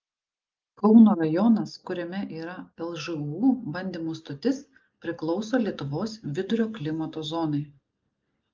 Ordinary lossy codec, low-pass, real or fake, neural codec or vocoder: Opus, 24 kbps; 7.2 kHz; real; none